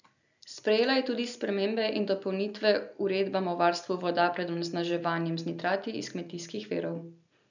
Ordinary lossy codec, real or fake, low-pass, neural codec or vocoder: none; real; 7.2 kHz; none